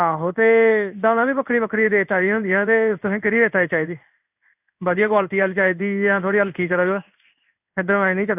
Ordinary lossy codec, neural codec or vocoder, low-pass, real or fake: none; codec, 16 kHz in and 24 kHz out, 1 kbps, XY-Tokenizer; 3.6 kHz; fake